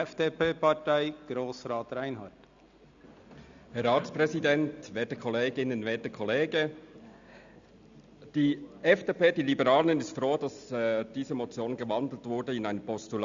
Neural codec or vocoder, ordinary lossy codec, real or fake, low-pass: none; Opus, 64 kbps; real; 7.2 kHz